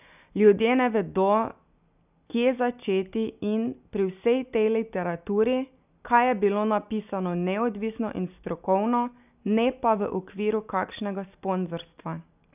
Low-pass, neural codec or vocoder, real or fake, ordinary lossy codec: 3.6 kHz; none; real; none